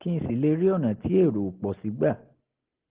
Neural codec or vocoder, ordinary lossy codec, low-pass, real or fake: none; Opus, 16 kbps; 3.6 kHz; real